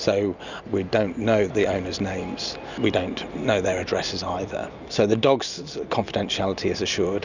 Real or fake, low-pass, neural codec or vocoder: real; 7.2 kHz; none